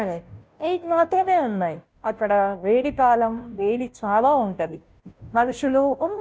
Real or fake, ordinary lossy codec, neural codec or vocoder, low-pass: fake; none; codec, 16 kHz, 0.5 kbps, FunCodec, trained on Chinese and English, 25 frames a second; none